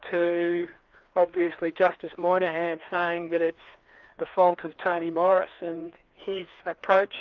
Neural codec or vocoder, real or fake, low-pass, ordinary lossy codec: codec, 16 kHz, 2 kbps, FreqCodec, larger model; fake; 7.2 kHz; Opus, 24 kbps